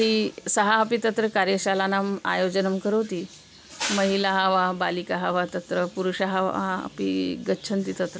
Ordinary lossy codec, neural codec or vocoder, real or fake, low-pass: none; none; real; none